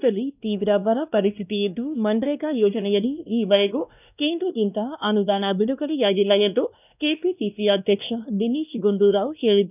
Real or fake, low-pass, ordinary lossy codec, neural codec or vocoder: fake; 3.6 kHz; none; codec, 16 kHz, 1 kbps, X-Codec, WavLM features, trained on Multilingual LibriSpeech